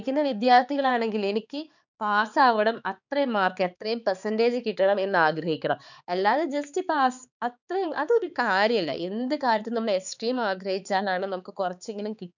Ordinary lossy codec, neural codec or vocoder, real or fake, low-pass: none; codec, 16 kHz, 4 kbps, X-Codec, HuBERT features, trained on balanced general audio; fake; 7.2 kHz